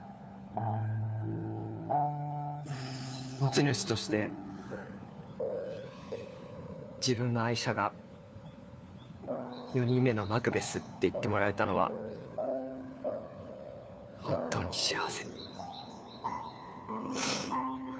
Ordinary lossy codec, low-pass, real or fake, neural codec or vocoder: none; none; fake; codec, 16 kHz, 4 kbps, FunCodec, trained on LibriTTS, 50 frames a second